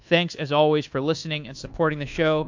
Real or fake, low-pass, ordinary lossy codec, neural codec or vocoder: fake; 7.2 kHz; AAC, 48 kbps; codec, 24 kHz, 1.2 kbps, DualCodec